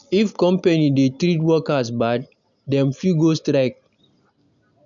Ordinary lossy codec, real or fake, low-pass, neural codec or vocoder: none; real; 7.2 kHz; none